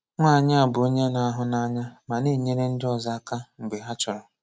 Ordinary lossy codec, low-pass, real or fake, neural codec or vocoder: none; none; real; none